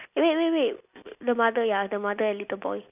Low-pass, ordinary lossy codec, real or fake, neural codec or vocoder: 3.6 kHz; none; real; none